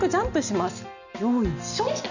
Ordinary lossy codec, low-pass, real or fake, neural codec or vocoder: none; 7.2 kHz; real; none